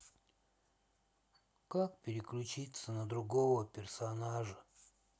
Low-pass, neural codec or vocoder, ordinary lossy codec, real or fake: none; none; none; real